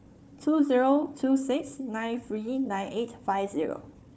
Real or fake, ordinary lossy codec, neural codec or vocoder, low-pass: fake; none; codec, 16 kHz, 4 kbps, FunCodec, trained on Chinese and English, 50 frames a second; none